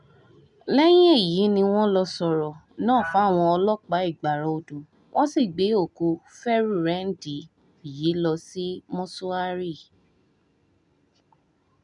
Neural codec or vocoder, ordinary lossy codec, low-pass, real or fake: none; none; 10.8 kHz; real